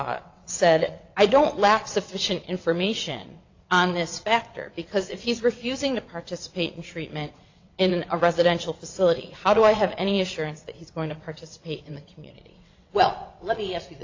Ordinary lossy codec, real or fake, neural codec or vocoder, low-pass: AAC, 48 kbps; fake; vocoder, 22.05 kHz, 80 mel bands, WaveNeXt; 7.2 kHz